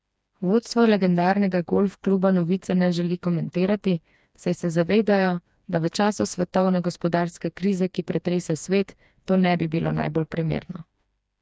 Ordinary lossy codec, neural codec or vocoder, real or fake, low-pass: none; codec, 16 kHz, 2 kbps, FreqCodec, smaller model; fake; none